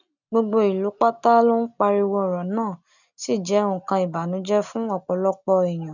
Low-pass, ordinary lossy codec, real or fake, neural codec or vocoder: 7.2 kHz; none; real; none